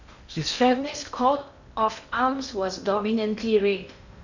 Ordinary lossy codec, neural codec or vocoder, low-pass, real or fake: none; codec, 16 kHz in and 24 kHz out, 0.6 kbps, FocalCodec, streaming, 2048 codes; 7.2 kHz; fake